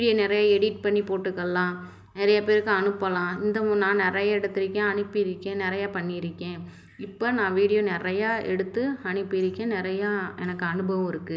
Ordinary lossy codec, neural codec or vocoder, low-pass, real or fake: none; none; none; real